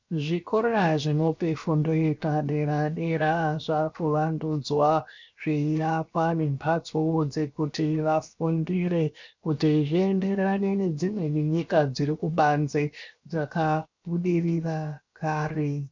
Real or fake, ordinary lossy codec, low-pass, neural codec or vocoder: fake; MP3, 64 kbps; 7.2 kHz; codec, 16 kHz, 0.7 kbps, FocalCodec